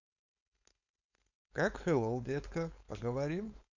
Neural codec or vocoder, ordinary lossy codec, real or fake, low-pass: codec, 16 kHz, 4.8 kbps, FACodec; none; fake; 7.2 kHz